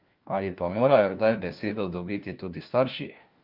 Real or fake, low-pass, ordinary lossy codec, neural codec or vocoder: fake; 5.4 kHz; Opus, 24 kbps; codec, 16 kHz, 1 kbps, FunCodec, trained on LibriTTS, 50 frames a second